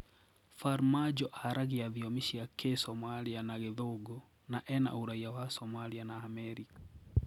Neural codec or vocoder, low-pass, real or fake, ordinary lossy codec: none; 19.8 kHz; real; none